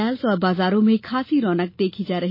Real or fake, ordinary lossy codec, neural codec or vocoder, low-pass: real; MP3, 24 kbps; none; 5.4 kHz